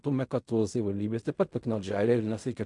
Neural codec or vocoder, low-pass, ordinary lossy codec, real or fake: codec, 16 kHz in and 24 kHz out, 0.4 kbps, LongCat-Audio-Codec, fine tuned four codebook decoder; 10.8 kHz; MP3, 64 kbps; fake